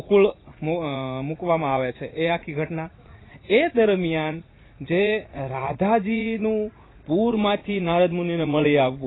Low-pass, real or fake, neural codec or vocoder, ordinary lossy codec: 7.2 kHz; fake; vocoder, 44.1 kHz, 80 mel bands, Vocos; AAC, 16 kbps